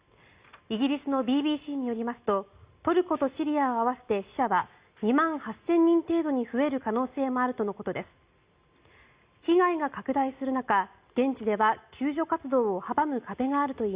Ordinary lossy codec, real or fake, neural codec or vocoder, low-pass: Opus, 64 kbps; real; none; 3.6 kHz